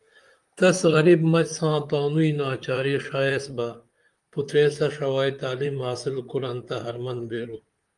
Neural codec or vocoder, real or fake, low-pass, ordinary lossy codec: vocoder, 44.1 kHz, 128 mel bands, Pupu-Vocoder; fake; 10.8 kHz; Opus, 32 kbps